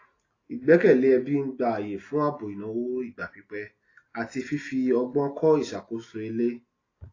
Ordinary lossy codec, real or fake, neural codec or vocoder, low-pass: AAC, 32 kbps; real; none; 7.2 kHz